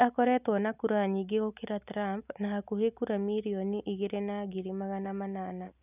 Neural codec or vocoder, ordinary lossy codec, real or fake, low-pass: none; none; real; 3.6 kHz